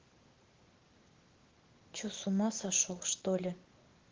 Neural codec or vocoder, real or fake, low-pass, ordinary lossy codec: none; real; 7.2 kHz; Opus, 16 kbps